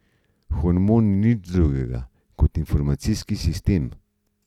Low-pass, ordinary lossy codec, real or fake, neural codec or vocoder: 19.8 kHz; none; real; none